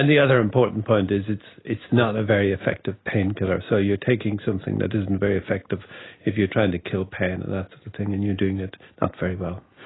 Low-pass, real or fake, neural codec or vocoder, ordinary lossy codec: 7.2 kHz; real; none; AAC, 16 kbps